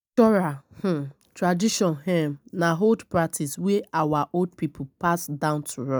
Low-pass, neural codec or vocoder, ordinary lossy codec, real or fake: none; none; none; real